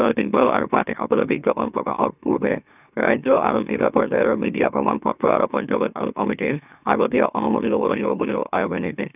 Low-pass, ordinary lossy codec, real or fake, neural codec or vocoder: 3.6 kHz; none; fake; autoencoder, 44.1 kHz, a latent of 192 numbers a frame, MeloTTS